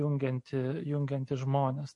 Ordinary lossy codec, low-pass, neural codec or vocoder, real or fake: MP3, 48 kbps; 10.8 kHz; none; real